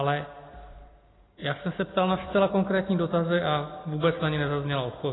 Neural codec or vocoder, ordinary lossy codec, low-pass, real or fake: none; AAC, 16 kbps; 7.2 kHz; real